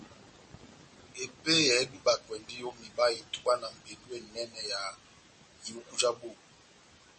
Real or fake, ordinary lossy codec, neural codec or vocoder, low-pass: real; MP3, 32 kbps; none; 10.8 kHz